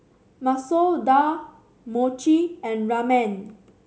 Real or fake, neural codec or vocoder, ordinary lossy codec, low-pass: real; none; none; none